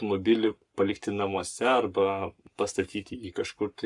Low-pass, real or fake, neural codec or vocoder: 10.8 kHz; fake; codec, 44.1 kHz, 7.8 kbps, Pupu-Codec